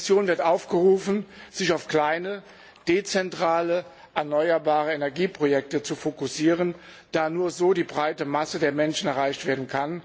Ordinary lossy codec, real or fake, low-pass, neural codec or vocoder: none; real; none; none